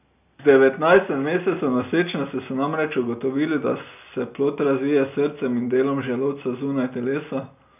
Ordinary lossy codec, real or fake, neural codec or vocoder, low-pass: none; real; none; 3.6 kHz